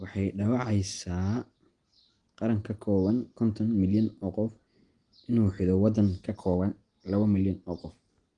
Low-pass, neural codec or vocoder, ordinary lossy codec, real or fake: 9.9 kHz; none; Opus, 24 kbps; real